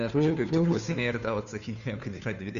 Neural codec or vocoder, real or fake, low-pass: codec, 16 kHz, 2 kbps, FunCodec, trained on Chinese and English, 25 frames a second; fake; 7.2 kHz